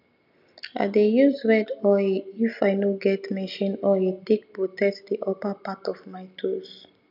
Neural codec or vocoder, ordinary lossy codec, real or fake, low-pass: none; none; real; 5.4 kHz